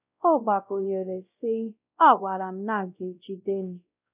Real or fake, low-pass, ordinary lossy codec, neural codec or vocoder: fake; 3.6 kHz; none; codec, 16 kHz, 0.5 kbps, X-Codec, WavLM features, trained on Multilingual LibriSpeech